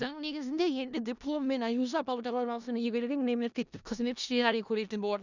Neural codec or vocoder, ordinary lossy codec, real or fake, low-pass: codec, 16 kHz in and 24 kHz out, 0.4 kbps, LongCat-Audio-Codec, four codebook decoder; none; fake; 7.2 kHz